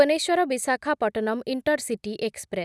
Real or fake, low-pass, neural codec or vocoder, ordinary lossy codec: real; none; none; none